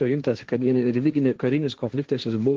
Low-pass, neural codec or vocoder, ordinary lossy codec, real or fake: 7.2 kHz; codec, 16 kHz, 1.1 kbps, Voila-Tokenizer; Opus, 16 kbps; fake